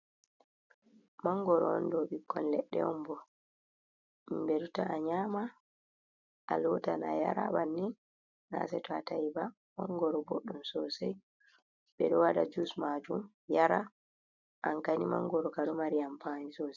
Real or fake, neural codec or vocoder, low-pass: real; none; 7.2 kHz